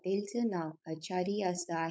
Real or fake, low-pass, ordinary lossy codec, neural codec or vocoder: fake; none; none; codec, 16 kHz, 4.8 kbps, FACodec